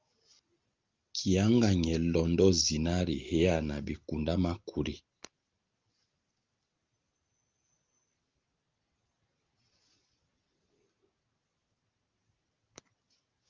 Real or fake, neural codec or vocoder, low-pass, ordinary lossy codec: real; none; 7.2 kHz; Opus, 32 kbps